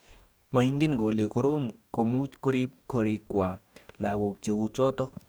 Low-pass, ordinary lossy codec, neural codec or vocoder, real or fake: none; none; codec, 44.1 kHz, 2.6 kbps, DAC; fake